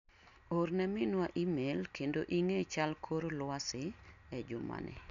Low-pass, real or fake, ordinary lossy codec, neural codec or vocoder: 7.2 kHz; real; MP3, 96 kbps; none